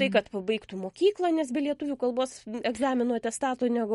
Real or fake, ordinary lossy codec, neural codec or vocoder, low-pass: real; MP3, 48 kbps; none; 19.8 kHz